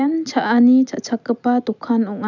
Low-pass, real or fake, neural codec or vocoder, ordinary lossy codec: 7.2 kHz; real; none; none